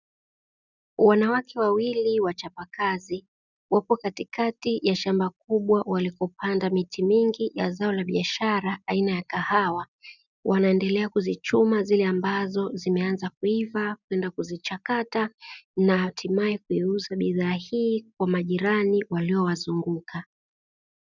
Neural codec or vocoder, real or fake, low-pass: none; real; 7.2 kHz